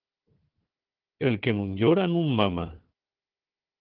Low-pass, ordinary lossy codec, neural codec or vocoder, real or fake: 5.4 kHz; Opus, 16 kbps; codec, 16 kHz, 4 kbps, FunCodec, trained on Chinese and English, 50 frames a second; fake